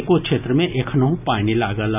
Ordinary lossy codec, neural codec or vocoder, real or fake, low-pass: none; none; real; 3.6 kHz